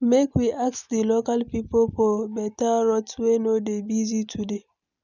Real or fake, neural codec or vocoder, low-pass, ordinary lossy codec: real; none; 7.2 kHz; none